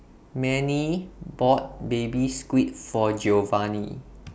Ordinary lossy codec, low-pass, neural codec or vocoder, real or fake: none; none; none; real